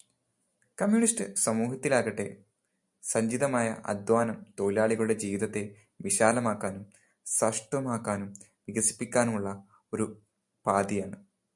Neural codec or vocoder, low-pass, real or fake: none; 10.8 kHz; real